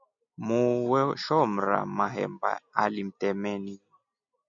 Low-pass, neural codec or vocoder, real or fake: 7.2 kHz; none; real